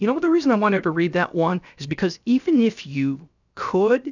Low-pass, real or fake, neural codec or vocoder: 7.2 kHz; fake; codec, 16 kHz, 0.7 kbps, FocalCodec